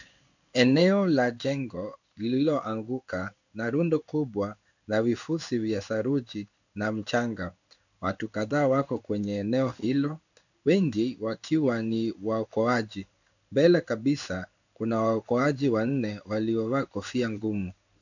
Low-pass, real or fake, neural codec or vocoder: 7.2 kHz; fake; codec, 16 kHz in and 24 kHz out, 1 kbps, XY-Tokenizer